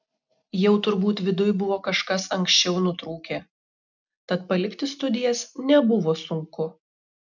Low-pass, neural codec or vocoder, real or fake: 7.2 kHz; none; real